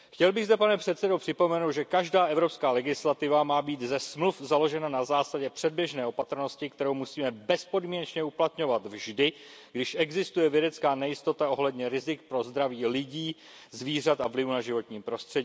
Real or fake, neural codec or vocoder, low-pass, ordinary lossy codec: real; none; none; none